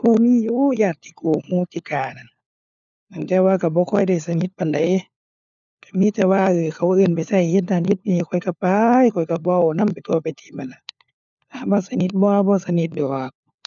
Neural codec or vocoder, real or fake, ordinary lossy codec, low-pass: codec, 16 kHz, 4 kbps, FunCodec, trained on LibriTTS, 50 frames a second; fake; none; 7.2 kHz